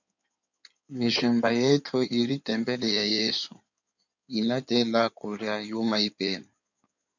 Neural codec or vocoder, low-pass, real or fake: codec, 16 kHz in and 24 kHz out, 2.2 kbps, FireRedTTS-2 codec; 7.2 kHz; fake